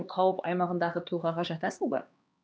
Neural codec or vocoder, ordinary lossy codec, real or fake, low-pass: codec, 16 kHz, 2 kbps, X-Codec, HuBERT features, trained on LibriSpeech; none; fake; none